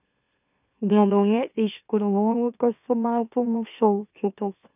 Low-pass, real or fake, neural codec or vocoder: 3.6 kHz; fake; autoencoder, 44.1 kHz, a latent of 192 numbers a frame, MeloTTS